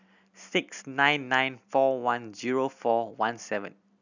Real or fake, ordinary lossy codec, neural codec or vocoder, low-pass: real; none; none; 7.2 kHz